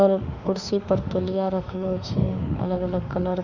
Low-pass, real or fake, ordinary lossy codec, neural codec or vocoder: 7.2 kHz; fake; none; autoencoder, 48 kHz, 32 numbers a frame, DAC-VAE, trained on Japanese speech